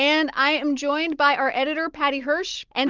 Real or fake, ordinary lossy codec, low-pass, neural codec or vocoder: real; Opus, 32 kbps; 7.2 kHz; none